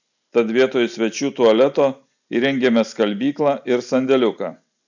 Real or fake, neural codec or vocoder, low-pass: real; none; 7.2 kHz